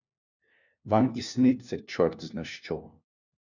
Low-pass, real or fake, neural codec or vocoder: 7.2 kHz; fake; codec, 16 kHz, 1 kbps, FunCodec, trained on LibriTTS, 50 frames a second